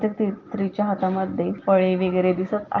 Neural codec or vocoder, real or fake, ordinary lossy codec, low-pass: none; real; Opus, 32 kbps; 7.2 kHz